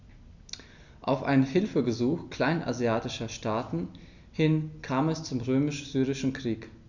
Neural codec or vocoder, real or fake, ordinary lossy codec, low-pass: none; real; none; 7.2 kHz